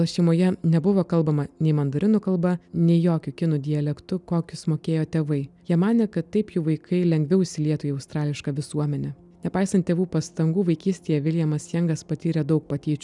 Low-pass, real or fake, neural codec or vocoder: 10.8 kHz; real; none